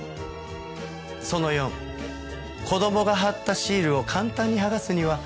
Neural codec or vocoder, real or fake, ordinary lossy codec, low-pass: none; real; none; none